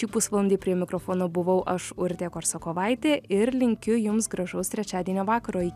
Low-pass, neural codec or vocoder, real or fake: 14.4 kHz; none; real